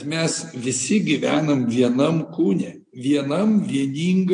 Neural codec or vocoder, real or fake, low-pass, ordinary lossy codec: none; real; 9.9 kHz; MP3, 48 kbps